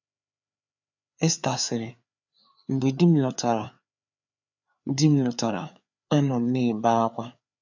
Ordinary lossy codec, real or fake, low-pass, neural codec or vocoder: none; fake; 7.2 kHz; codec, 16 kHz, 4 kbps, FreqCodec, larger model